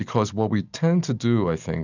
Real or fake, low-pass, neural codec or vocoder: real; 7.2 kHz; none